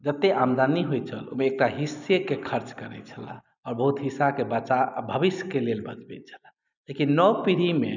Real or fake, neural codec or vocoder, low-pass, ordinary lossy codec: real; none; 7.2 kHz; none